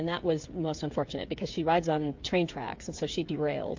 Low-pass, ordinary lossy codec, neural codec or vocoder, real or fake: 7.2 kHz; MP3, 48 kbps; codec, 16 kHz, 8 kbps, FreqCodec, smaller model; fake